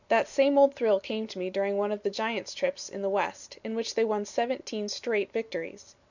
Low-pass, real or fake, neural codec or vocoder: 7.2 kHz; real; none